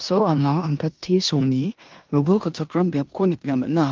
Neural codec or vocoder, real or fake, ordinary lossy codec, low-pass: codec, 16 kHz in and 24 kHz out, 0.9 kbps, LongCat-Audio-Codec, four codebook decoder; fake; Opus, 24 kbps; 7.2 kHz